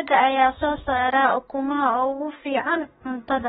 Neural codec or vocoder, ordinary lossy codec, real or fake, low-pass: codec, 32 kHz, 1.9 kbps, SNAC; AAC, 16 kbps; fake; 14.4 kHz